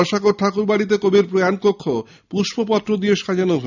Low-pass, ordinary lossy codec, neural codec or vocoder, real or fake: 7.2 kHz; none; none; real